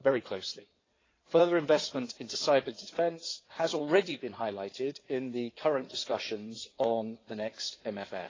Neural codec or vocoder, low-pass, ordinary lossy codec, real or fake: codec, 16 kHz in and 24 kHz out, 2.2 kbps, FireRedTTS-2 codec; 7.2 kHz; AAC, 32 kbps; fake